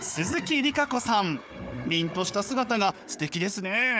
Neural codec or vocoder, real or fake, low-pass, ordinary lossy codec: codec, 16 kHz, 4 kbps, FunCodec, trained on Chinese and English, 50 frames a second; fake; none; none